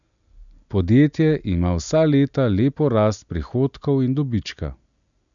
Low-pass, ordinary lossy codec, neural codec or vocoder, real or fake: 7.2 kHz; none; none; real